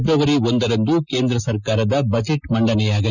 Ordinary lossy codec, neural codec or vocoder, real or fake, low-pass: none; none; real; 7.2 kHz